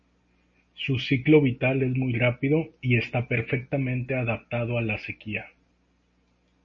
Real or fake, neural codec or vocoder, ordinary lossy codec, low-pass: real; none; MP3, 32 kbps; 7.2 kHz